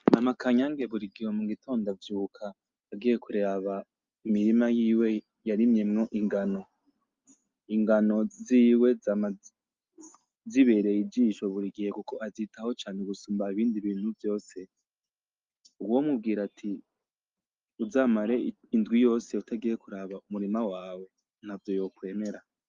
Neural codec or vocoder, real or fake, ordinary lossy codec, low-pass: none; real; Opus, 32 kbps; 7.2 kHz